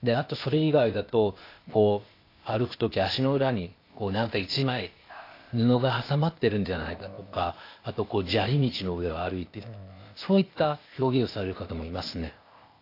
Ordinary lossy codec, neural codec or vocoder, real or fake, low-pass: AAC, 32 kbps; codec, 16 kHz, 0.8 kbps, ZipCodec; fake; 5.4 kHz